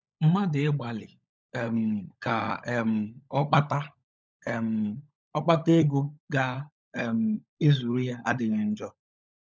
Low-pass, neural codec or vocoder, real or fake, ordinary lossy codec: none; codec, 16 kHz, 16 kbps, FunCodec, trained on LibriTTS, 50 frames a second; fake; none